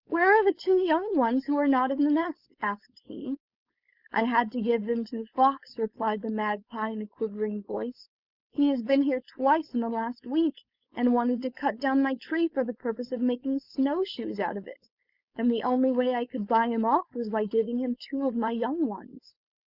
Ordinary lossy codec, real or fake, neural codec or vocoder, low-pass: Opus, 64 kbps; fake; codec, 16 kHz, 4.8 kbps, FACodec; 5.4 kHz